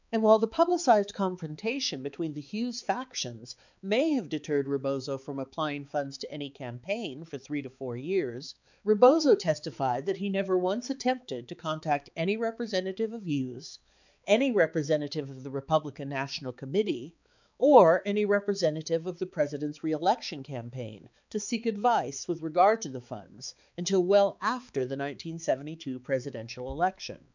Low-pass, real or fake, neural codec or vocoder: 7.2 kHz; fake; codec, 16 kHz, 4 kbps, X-Codec, HuBERT features, trained on balanced general audio